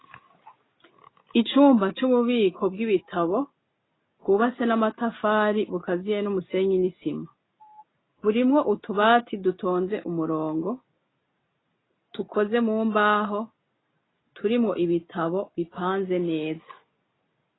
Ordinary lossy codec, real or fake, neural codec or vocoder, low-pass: AAC, 16 kbps; real; none; 7.2 kHz